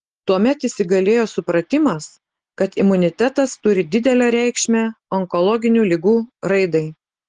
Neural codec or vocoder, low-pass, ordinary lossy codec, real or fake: none; 10.8 kHz; Opus, 16 kbps; real